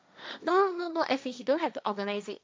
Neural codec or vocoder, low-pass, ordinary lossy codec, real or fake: codec, 16 kHz, 1.1 kbps, Voila-Tokenizer; none; none; fake